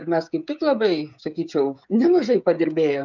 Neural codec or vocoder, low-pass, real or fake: codec, 16 kHz, 16 kbps, FreqCodec, smaller model; 7.2 kHz; fake